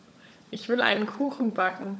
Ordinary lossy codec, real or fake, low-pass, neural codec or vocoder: none; fake; none; codec, 16 kHz, 16 kbps, FunCodec, trained on LibriTTS, 50 frames a second